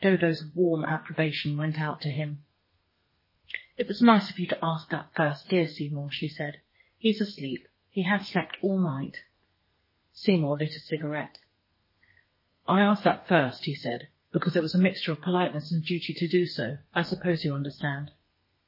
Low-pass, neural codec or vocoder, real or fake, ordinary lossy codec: 5.4 kHz; codec, 32 kHz, 1.9 kbps, SNAC; fake; MP3, 24 kbps